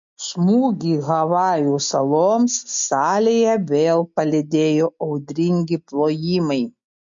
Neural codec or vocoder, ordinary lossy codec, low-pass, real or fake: none; MP3, 48 kbps; 7.2 kHz; real